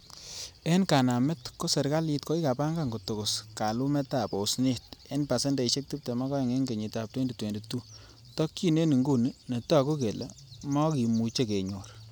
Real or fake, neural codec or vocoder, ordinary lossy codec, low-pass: real; none; none; none